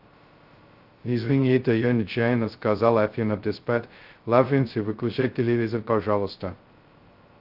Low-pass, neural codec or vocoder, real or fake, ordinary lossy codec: 5.4 kHz; codec, 16 kHz, 0.2 kbps, FocalCodec; fake; Opus, 32 kbps